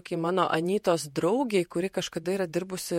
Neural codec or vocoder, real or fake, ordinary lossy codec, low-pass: vocoder, 48 kHz, 128 mel bands, Vocos; fake; MP3, 64 kbps; 19.8 kHz